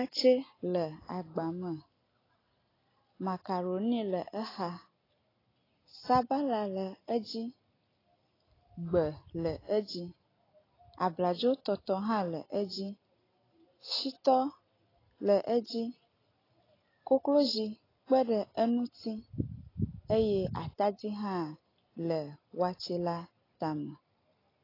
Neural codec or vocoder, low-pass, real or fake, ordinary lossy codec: none; 5.4 kHz; real; AAC, 24 kbps